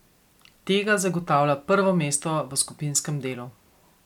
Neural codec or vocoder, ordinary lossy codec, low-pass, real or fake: none; MP3, 96 kbps; 19.8 kHz; real